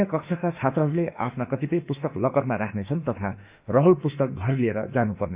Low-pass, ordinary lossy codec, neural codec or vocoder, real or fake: 3.6 kHz; Opus, 64 kbps; autoencoder, 48 kHz, 32 numbers a frame, DAC-VAE, trained on Japanese speech; fake